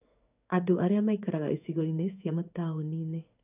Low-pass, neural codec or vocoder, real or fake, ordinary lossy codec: 3.6 kHz; codec, 16 kHz in and 24 kHz out, 1 kbps, XY-Tokenizer; fake; none